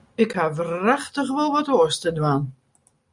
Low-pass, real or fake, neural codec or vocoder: 10.8 kHz; real; none